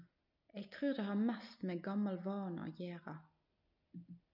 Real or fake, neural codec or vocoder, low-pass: real; none; 5.4 kHz